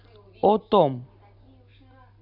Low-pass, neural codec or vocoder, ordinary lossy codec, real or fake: 5.4 kHz; none; none; real